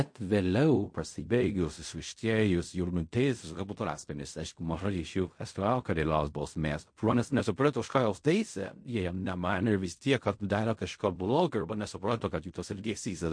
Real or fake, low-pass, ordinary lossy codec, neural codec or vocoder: fake; 9.9 kHz; MP3, 48 kbps; codec, 16 kHz in and 24 kHz out, 0.4 kbps, LongCat-Audio-Codec, fine tuned four codebook decoder